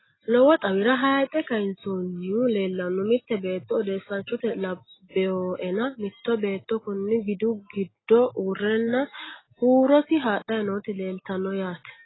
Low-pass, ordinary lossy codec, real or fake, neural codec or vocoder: 7.2 kHz; AAC, 16 kbps; real; none